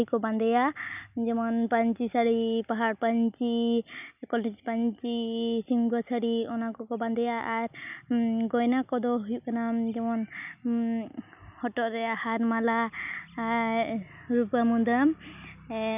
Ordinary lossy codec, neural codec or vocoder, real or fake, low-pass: none; none; real; 3.6 kHz